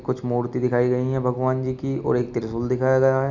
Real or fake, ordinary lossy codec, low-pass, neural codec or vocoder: real; Opus, 64 kbps; 7.2 kHz; none